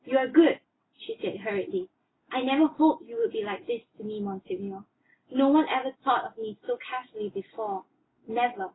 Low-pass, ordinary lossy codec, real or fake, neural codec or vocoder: 7.2 kHz; AAC, 16 kbps; real; none